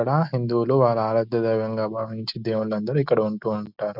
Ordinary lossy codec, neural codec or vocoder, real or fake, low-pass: none; none; real; 5.4 kHz